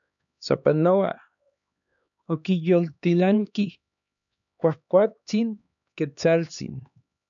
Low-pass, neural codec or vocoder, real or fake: 7.2 kHz; codec, 16 kHz, 2 kbps, X-Codec, HuBERT features, trained on LibriSpeech; fake